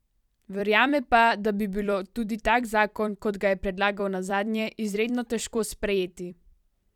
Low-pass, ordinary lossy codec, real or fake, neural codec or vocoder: 19.8 kHz; none; fake; vocoder, 44.1 kHz, 128 mel bands every 256 samples, BigVGAN v2